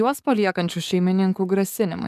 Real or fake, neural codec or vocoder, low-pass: fake; codec, 44.1 kHz, 7.8 kbps, DAC; 14.4 kHz